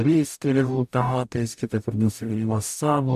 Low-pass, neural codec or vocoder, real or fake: 14.4 kHz; codec, 44.1 kHz, 0.9 kbps, DAC; fake